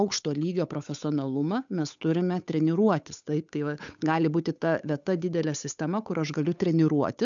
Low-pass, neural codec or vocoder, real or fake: 7.2 kHz; codec, 16 kHz, 8 kbps, FunCodec, trained on Chinese and English, 25 frames a second; fake